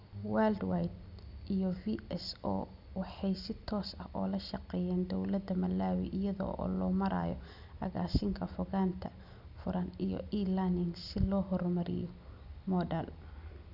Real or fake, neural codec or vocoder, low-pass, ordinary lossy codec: real; none; 5.4 kHz; none